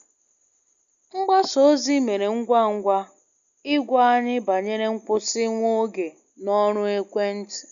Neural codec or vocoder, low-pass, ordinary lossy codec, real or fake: none; 7.2 kHz; none; real